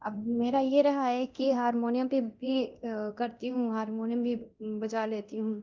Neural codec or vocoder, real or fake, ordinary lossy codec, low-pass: codec, 24 kHz, 0.9 kbps, DualCodec; fake; Opus, 24 kbps; 7.2 kHz